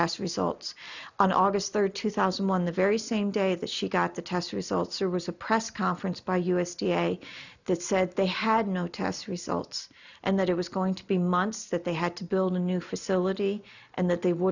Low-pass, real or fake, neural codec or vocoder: 7.2 kHz; real; none